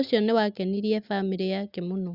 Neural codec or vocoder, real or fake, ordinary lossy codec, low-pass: none; real; Opus, 64 kbps; 5.4 kHz